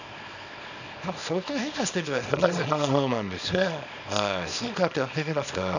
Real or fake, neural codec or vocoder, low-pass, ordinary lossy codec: fake; codec, 24 kHz, 0.9 kbps, WavTokenizer, small release; 7.2 kHz; none